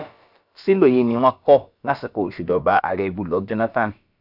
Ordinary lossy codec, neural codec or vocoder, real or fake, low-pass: none; codec, 16 kHz, about 1 kbps, DyCAST, with the encoder's durations; fake; 5.4 kHz